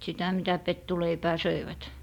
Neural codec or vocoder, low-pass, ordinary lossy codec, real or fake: vocoder, 44.1 kHz, 128 mel bands every 512 samples, BigVGAN v2; 19.8 kHz; none; fake